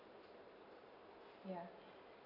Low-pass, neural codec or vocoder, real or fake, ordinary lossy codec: 5.4 kHz; none; real; none